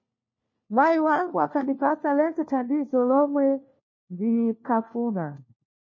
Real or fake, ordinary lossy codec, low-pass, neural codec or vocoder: fake; MP3, 32 kbps; 7.2 kHz; codec, 16 kHz, 1 kbps, FunCodec, trained on LibriTTS, 50 frames a second